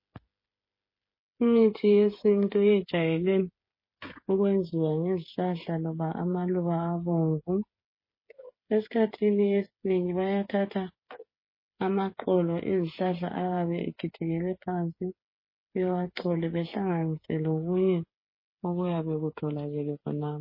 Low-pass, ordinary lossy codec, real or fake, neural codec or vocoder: 5.4 kHz; MP3, 24 kbps; fake; codec, 16 kHz, 8 kbps, FreqCodec, smaller model